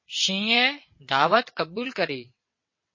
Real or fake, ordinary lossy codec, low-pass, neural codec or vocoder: fake; MP3, 32 kbps; 7.2 kHz; vocoder, 22.05 kHz, 80 mel bands, WaveNeXt